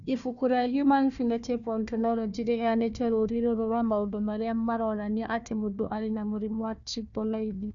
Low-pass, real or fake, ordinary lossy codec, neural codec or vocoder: 7.2 kHz; fake; none; codec, 16 kHz, 1 kbps, FunCodec, trained on Chinese and English, 50 frames a second